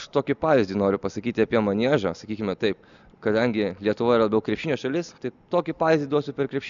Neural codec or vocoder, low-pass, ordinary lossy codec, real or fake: none; 7.2 kHz; MP3, 96 kbps; real